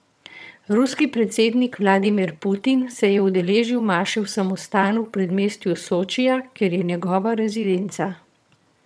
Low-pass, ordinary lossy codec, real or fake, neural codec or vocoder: none; none; fake; vocoder, 22.05 kHz, 80 mel bands, HiFi-GAN